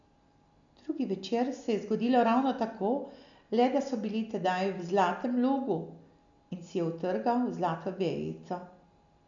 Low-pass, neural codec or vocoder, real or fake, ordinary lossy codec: 7.2 kHz; none; real; none